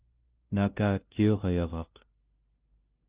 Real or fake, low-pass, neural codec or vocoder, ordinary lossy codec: fake; 3.6 kHz; codec, 16 kHz, 0.5 kbps, FunCodec, trained on LibriTTS, 25 frames a second; Opus, 32 kbps